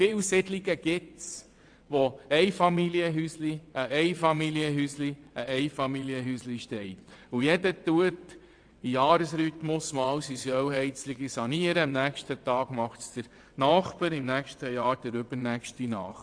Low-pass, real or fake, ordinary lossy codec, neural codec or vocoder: 9.9 kHz; fake; AAC, 64 kbps; vocoder, 22.05 kHz, 80 mel bands, WaveNeXt